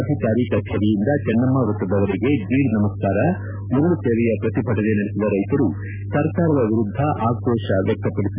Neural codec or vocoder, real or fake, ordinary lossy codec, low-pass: none; real; none; 3.6 kHz